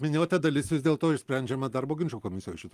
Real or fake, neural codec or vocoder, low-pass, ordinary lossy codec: real; none; 14.4 kHz; Opus, 32 kbps